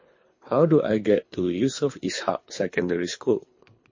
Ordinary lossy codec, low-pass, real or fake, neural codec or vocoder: MP3, 32 kbps; 7.2 kHz; fake; codec, 24 kHz, 3 kbps, HILCodec